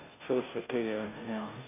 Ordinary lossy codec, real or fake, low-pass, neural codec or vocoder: none; fake; 3.6 kHz; codec, 16 kHz, 0.5 kbps, FunCodec, trained on Chinese and English, 25 frames a second